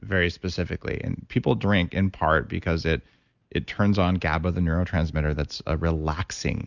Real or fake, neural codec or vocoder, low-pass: real; none; 7.2 kHz